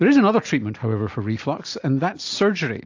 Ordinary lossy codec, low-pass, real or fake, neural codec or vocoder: AAC, 48 kbps; 7.2 kHz; real; none